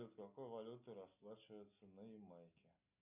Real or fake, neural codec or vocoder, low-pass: real; none; 3.6 kHz